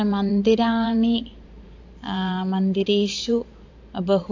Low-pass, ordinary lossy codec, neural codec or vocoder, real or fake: 7.2 kHz; AAC, 48 kbps; vocoder, 44.1 kHz, 128 mel bands every 512 samples, BigVGAN v2; fake